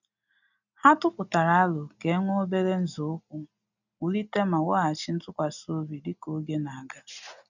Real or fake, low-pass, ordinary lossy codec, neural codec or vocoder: real; 7.2 kHz; none; none